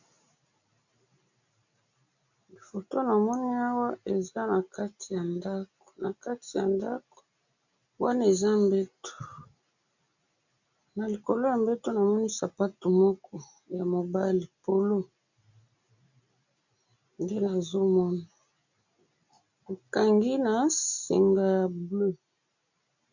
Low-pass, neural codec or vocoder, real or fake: 7.2 kHz; none; real